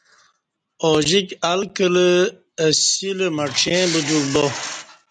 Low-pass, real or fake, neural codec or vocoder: 9.9 kHz; real; none